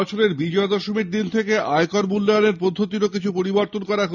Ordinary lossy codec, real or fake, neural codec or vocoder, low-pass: none; real; none; 7.2 kHz